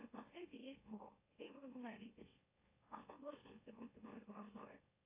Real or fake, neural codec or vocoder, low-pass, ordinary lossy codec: fake; autoencoder, 44.1 kHz, a latent of 192 numbers a frame, MeloTTS; 3.6 kHz; AAC, 32 kbps